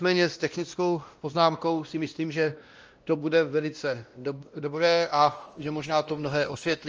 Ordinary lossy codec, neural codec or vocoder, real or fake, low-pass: Opus, 24 kbps; codec, 16 kHz, 1 kbps, X-Codec, WavLM features, trained on Multilingual LibriSpeech; fake; 7.2 kHz